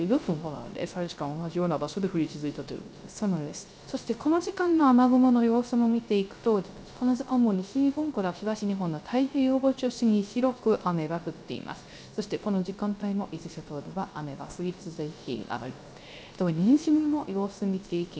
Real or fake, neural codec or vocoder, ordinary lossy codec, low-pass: fake; codec, 16 kHz, 0.3 kbps, FocalCodec; none; none